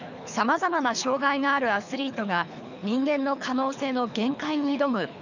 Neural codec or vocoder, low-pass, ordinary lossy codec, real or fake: codec, 24 kHz, 3 kbps, HILCodec; 7.2 kHz; none; fake